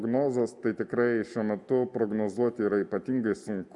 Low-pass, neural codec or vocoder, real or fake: 10.8 kHz; none; real